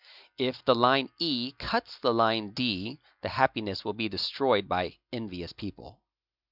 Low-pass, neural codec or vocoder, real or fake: 5.4 kHz; none; real